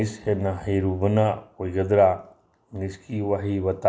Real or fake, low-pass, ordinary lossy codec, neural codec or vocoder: real; none; none; none